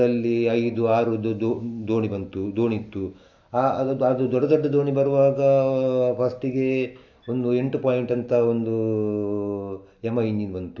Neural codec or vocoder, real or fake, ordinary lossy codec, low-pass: none; real; AAC, 48 kbps; 7.2 kHz